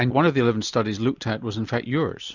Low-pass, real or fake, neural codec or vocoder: 7.2 kHz; real; none